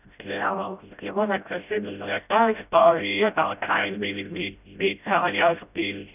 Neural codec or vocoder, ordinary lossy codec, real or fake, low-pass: codec, 16 kHz, 0.5 kbps, FreqCodec, smaller model; none; fake; 3.6 kHz